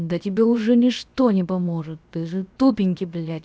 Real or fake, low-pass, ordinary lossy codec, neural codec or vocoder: fake; none; none; codec, 16 kHz, about 1 kbps, DyCAST, with the encoder's durations